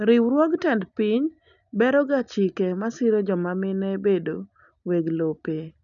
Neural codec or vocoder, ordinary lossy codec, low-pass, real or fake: none; none; 7.2 kHz; real